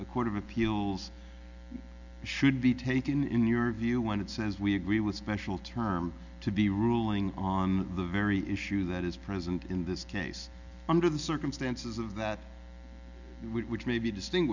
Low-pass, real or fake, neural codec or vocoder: 7.2 kHz; real; none